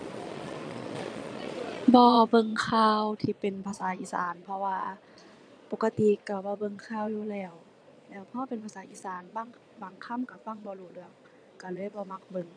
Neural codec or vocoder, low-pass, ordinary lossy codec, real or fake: vocoder, 22.05 kHz, 80 mel bands, Vocos; 9.9 kHz; none; fake